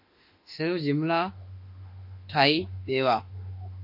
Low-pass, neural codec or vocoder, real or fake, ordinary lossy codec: 5.4 kHz; autoencoder, 48 kHz, 32 numbers a frame, DAC-VAE, trained on Japanese speech; fake; MP3, 32 kbps